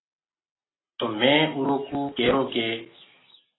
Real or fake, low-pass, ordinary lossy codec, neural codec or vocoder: real; 7.2 kHz; AAC, 16 kbps; none